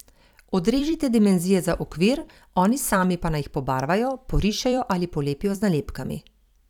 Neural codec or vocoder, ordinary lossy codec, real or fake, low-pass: vocoder, 44.1 kHz, 128 mel bands every 256 samples, BigVGAN v2; none; fake; 19.8 kHz